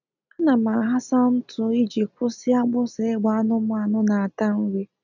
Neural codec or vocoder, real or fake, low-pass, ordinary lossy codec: none; real; 7.2 kHz; none